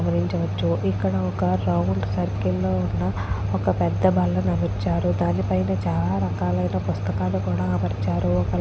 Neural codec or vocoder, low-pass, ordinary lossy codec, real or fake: none; none; none; real